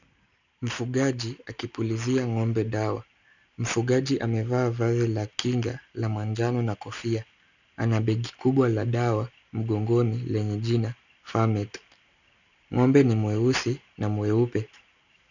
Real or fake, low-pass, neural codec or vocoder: real; 7.2 kHz; none